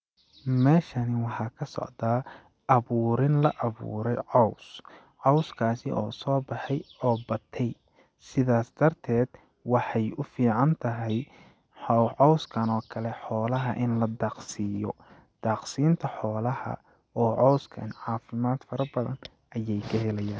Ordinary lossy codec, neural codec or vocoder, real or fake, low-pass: none; none; real; none